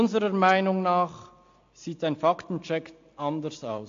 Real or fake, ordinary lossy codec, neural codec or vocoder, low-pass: real; AAC, 48 kbps; none; 7.2 kHz